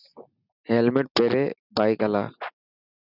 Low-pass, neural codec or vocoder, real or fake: 5.4 kHz; none; real